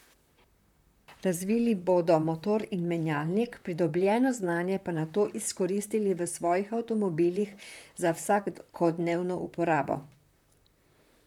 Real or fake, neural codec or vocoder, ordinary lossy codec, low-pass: fake; vocoder, 44.1 kHz, 128 mel bands, Pupu-Vocoder; none; 19.8 kHz